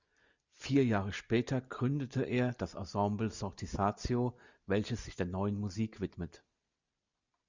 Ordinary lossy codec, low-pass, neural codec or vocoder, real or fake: Opus, 64 kbps; 7.2 kHz; none; real